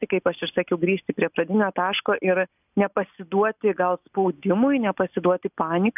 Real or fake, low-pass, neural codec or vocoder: real; 3.6 kHz; none